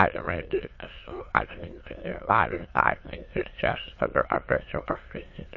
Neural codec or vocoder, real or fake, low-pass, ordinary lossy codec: autoencoder, 22.05 kHz, a latent of 192 numbers a frame, VITS, trained on many speakers; fake; 7.2 kHz; MP3, 32 kbps